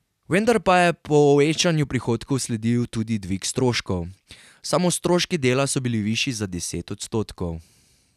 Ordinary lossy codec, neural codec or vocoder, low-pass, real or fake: none; none; 14.4 kHz; real